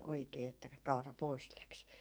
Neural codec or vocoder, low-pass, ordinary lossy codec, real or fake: codec, 44.1 kHz, 2.6 kbps, SNAC; none; none; fake